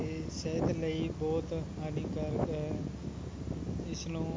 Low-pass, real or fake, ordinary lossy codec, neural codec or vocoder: none; real; none; none